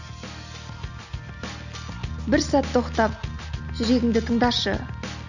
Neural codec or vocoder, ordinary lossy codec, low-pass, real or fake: none; none; 7.2 kHz; real